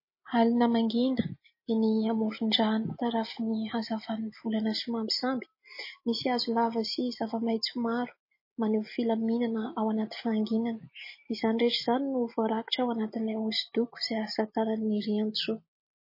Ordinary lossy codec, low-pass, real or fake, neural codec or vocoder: MP3, 24 kbps; 5.4 kHz; real; none